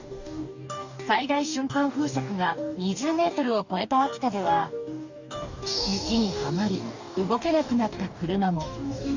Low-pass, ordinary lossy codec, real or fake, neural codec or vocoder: 7.2 kHz; none; fake; codec, 44.1 kHz, 2.6 kbps, DAC